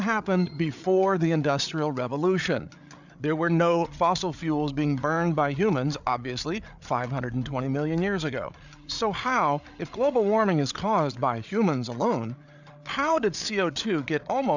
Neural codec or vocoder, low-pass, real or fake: codec, 16 kHz, 8 kbps, FreqCodec, larger model; 7.2 kHz; fake